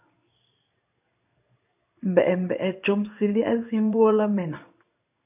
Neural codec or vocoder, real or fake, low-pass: codec, 16 kHz in and 24 kHz out, 1 kbps, XY-Tokenizer; fake; 3.6 kHz